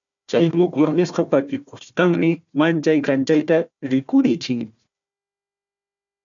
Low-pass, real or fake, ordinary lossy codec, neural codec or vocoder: 7.2 kHz; fake; MP3, 96 kbps; codec, 16 kHz, 1 kbps, FunCodec, trained on Chinese and English, 50 frames a second